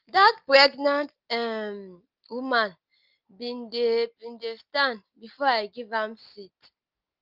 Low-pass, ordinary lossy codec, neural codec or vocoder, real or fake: 5.4 kHz; Opus, 32 kbps; none; real